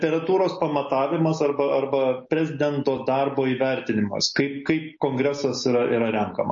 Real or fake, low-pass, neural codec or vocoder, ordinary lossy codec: real; 7.2 kHz; none; MP3, 32 kbps